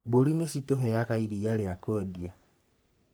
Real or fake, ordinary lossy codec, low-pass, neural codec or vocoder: fake; none; none; codec, 44.1 kHz, 3.4 kbps, Pupu-Codec